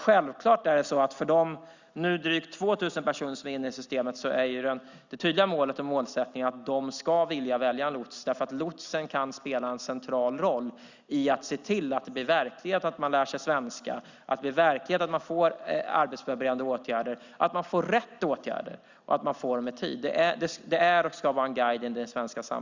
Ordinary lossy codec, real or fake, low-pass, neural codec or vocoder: Opus, 64 kbps; real; 7.2 kHz; none